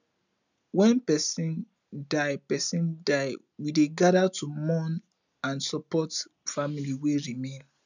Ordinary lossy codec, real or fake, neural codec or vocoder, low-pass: none; real; none; 7.2 kHz